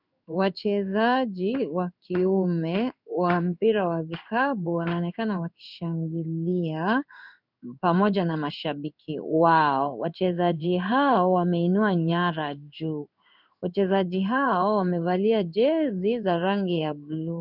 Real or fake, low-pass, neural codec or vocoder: fake; 5.4 kHz; codec, 16 kHz in and 24 kHz out, 1 kbps, XY-Tokenizer